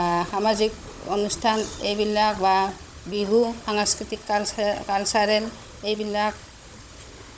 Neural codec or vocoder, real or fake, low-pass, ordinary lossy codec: codec, 16 kHz, 16 kbps, FunCodec, trained on Chinese and English, 50 frames a second; fake; none; none